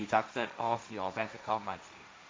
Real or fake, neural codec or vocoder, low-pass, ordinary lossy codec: fake; codec, 16 kHz, 1.1 kbps, Voila-Tokenizer; none; none